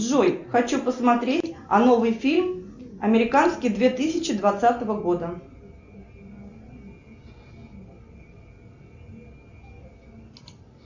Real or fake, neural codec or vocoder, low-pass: real; none; 7.2 kHz